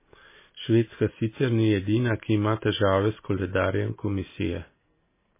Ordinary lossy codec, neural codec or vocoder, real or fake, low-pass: MP3, 16 kbps; none; real; 3.6 kHz